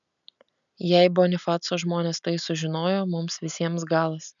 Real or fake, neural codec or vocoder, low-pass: real; none; 7.2 kHz